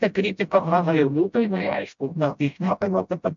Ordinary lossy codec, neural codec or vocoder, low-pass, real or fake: MP3, 64 kbps; codec, 16 kHz, 0.5 kbps, FreqCodec, smaller model; 7.2 kHz; fake